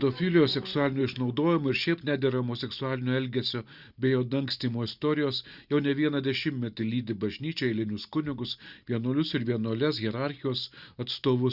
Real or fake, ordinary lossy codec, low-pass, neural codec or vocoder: real; Opus, 64 kbps; 5.4 kHz; none